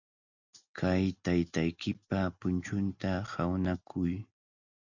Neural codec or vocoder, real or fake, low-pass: none; real; 7.2 kHz